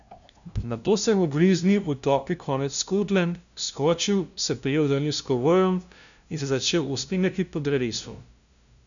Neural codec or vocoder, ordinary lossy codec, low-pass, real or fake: codec, 16 kHz, 0.5 kbps, FunCodec, trained on LibriTTS, 25 frames a second; none; 7.2 kHz; fake